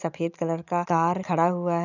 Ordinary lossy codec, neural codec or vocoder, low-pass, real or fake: none; none; 7.2 kHz; real